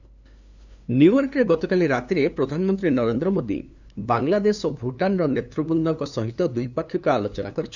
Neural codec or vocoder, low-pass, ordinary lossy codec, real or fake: codec, 16 kHz, 2 kbps, FunCodec, trained on LibriTTS, 25 frames a second; 7.2 kHz; none; fake